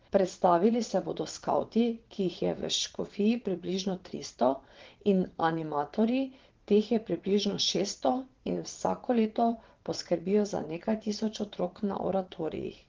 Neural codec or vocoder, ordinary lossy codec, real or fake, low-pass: vocoder, 22.05 kHz, 80 mel bands, WaveNeXt; Opus, 16 kbps; fake; 7.2 kHz